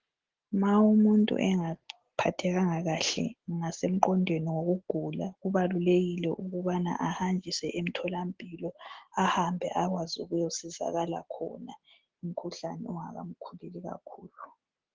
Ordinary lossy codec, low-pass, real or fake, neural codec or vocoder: Opus, 16 kbps; 7.2 kHz; real; none